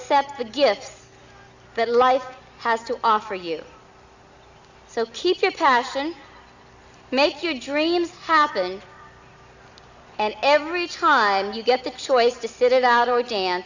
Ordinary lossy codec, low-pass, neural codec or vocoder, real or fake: Opus, 64 kbps; 7.2 kHz; none; real